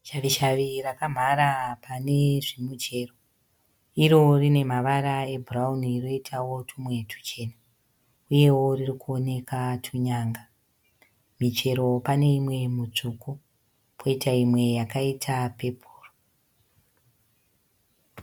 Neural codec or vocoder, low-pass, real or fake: none; 19.8 kHz; real